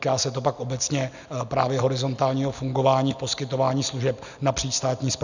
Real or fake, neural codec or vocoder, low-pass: real; none; 7.2 kHz